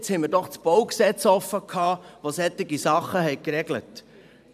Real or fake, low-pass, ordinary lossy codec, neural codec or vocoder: fake; 14.4 kHz; none; vocoder, 44.1 kHz, 128 mel bands every 512 samples, BigVGAN v2